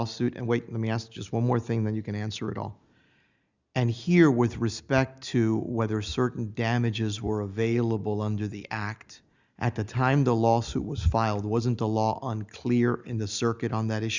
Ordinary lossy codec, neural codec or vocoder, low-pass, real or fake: Opus, 64 kbps; none; 7.2 kHz; real